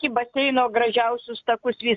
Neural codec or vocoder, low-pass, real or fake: none; 7.2 kHz; real